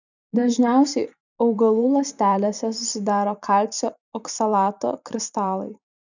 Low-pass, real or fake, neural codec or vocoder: 7.2 kHz; fake; vocoder, 44.1 kHz, 128 mel bands every 512 samples, BigVGAN v2